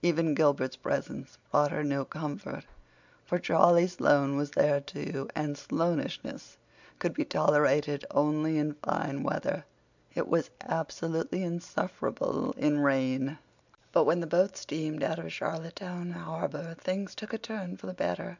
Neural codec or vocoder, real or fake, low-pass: none; real; 7.2 kHz